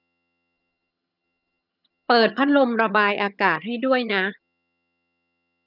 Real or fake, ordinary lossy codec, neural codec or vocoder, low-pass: fake; none; vocoder, 22.05 kHz, 80 mel bands, HiFi-GAN; 5.4 kHz